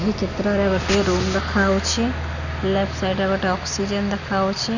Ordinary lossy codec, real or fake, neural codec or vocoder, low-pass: none; real; none; 7.2 kHz